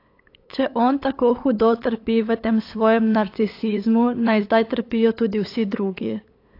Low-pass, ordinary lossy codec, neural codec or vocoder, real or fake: 5.4 kHz; AAC, 32 kbps; codec, 16 kHz, 8 kbps, FunCodec, trained on LibriTTS, 25 frames a second; fake